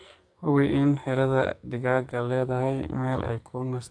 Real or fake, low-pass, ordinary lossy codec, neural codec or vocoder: fake; 9.9 kHz; AAC, 64 kbps; codec, 44.1 kHz, 2.6 kbps, SNAC